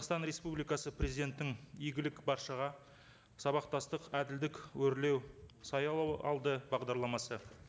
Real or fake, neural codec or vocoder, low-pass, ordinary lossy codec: real; none; none; none